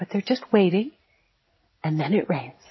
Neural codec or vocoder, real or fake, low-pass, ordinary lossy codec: none; real; 7.2 kHz; MP3, 24 kbps